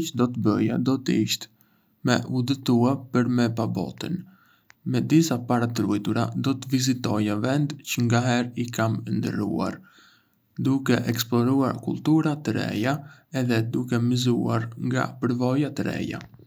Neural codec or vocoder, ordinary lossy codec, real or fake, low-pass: none; none; real; none